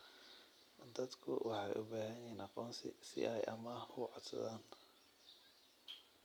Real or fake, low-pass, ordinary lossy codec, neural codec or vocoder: real; none; none; none